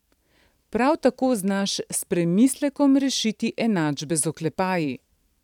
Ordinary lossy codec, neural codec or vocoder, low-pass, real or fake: none; vocoder, 44.1 kHz, 128 mel bands every 512 samples, BigVGAN v2; 19.8 kHz; fake